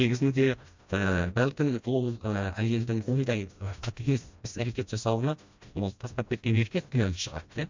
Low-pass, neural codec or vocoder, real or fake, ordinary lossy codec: 7.2 kHz; codec, 16 kHz, 1 kbps, FreqCodec, smaller model; fake; MP3, 64 kbps